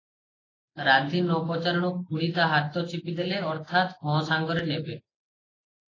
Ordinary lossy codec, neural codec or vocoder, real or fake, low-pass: AAC, 32 kbps; none; real; 7.2 kHz